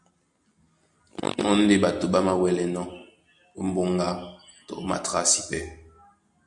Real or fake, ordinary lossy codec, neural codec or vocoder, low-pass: real; MP3, 96 kbps; none; 10.8 kHz